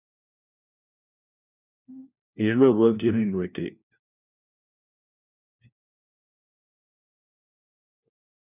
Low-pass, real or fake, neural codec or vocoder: 3.6 kHz; fake; codec, 16 kHz, 1 kbps, FunCodec, trained on LibriTTS, 50 frames a second